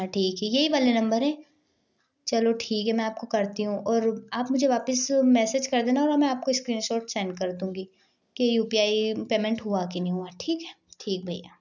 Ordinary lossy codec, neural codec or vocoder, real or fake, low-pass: none; none; real; 7.2 kHz